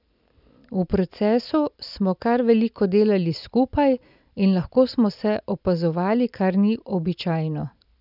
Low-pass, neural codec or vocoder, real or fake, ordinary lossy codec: 5.4 kHz; none; real; none